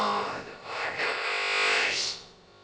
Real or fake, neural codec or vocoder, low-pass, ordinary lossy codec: fake; codec, 16 kHz, about 1 kbps, DyCAST, with the encoder's durations; none; none